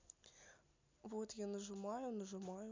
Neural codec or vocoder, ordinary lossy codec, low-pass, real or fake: none; MP3, 64 kbps; 7.2 kHz; real